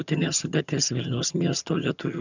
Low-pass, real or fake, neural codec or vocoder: 7.2 kHz; fake; vocoder, 22.05 kHz, 80 mel bands, HiFi-GAN